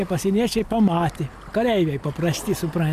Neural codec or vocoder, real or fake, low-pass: none; real; 14.4 kHz